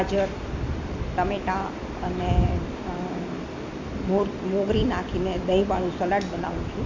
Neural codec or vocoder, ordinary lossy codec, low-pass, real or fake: none; MP3, 48 kbps; 7.2 kHz; real